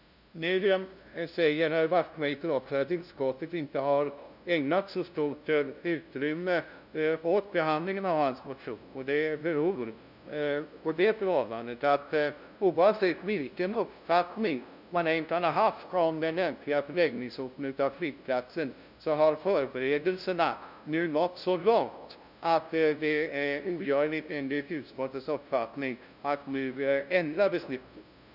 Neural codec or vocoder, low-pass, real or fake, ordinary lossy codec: codec, 16 kHz, 0.5 kbps, FunCodec, trained on LibriTTS, 25 frames a second; 5.4 kHz; fake; none